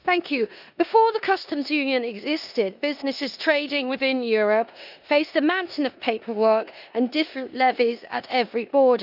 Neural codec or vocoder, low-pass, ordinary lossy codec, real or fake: codec, 16 kHz in and 24 kHz out, 0.9 kbps, LongCat-Audio-Codec, four codebook decoder; 5.4 kHz; none; fake